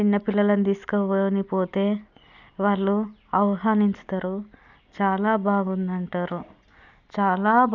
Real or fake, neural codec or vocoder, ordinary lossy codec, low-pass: real; none; none; 7.2 kHz